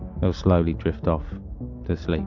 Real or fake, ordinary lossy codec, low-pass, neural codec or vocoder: real; MP3, 48 kbps; 7.2 kHz; none